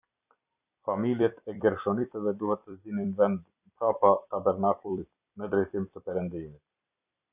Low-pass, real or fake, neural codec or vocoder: 3.6 kHz; fake; vocoder, 44.1 kHz, 128 mel bands every 256 samples, BigVGAN v2